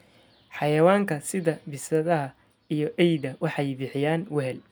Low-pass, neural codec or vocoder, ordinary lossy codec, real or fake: none; none; none; real